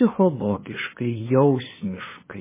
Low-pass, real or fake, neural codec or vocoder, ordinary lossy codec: 3.6 kHz; fake; codec, 16 kHz, 2 kbps, FunCodec, trained on LibriTTS, 25 frames a second; MP3, 16 kbps